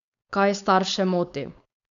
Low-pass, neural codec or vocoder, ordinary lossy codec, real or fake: 7.2 kHz; codec, 16 kHz, 4.8 kbps, FACodec; none; fake